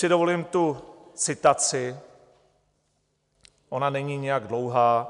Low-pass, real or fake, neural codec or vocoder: 10.8 kHz; real; none